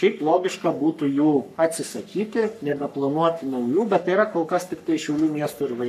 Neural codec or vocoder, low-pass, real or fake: codec, 44.1 kHz, 3.4 kbps, Pupu-Codec; 14.4 kHz; fake